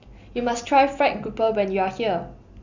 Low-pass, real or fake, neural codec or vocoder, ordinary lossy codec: 7.2 kHz; real; none; none